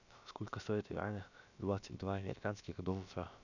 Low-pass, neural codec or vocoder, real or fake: 7.2 kHz; codec, 16 kHz, about 1 kbps, DyCAST, with the encoder's durations; fake